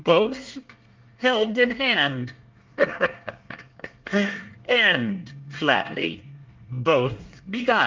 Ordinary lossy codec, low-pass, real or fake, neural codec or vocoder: Opus, 32 kbps; 7.2 kHz; fake; codec, 24 kHz, 1 kbps, SNAC